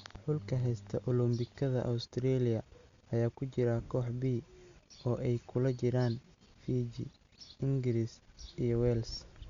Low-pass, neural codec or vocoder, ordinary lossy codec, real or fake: 7.2 kHz; none; Opus, 64 kbps; real